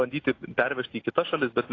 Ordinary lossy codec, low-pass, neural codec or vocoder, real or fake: AAC, 32 kbps; 7.2 kHz; none; real